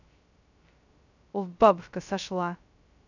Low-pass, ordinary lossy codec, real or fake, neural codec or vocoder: 7.2 kHz; none; fake; codec, 16 kHz, 0.2 kbps, FocalCodec